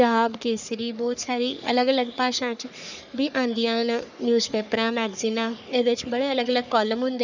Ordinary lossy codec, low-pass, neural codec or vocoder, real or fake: none; 7.2 kHz; codec, 44.1 kHz, 3.4 kbps, Pupu-Codec; fake